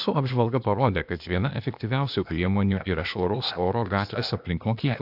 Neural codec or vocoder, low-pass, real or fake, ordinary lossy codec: codec, 16 kHz, 0.8 kbps, ZipCodec; 5.4 kHz; fake; AAC, 48 kbps